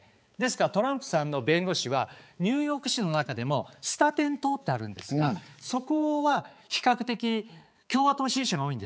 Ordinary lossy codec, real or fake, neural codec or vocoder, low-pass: none; fake; codec, 16 kHz, 4 kbps, X-Codec, HuBERT features, trained on balanced general audio; none